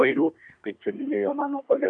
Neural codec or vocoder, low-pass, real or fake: codec, 24 kHz, 1 kbps, SNAC; 9.9 kHz; fake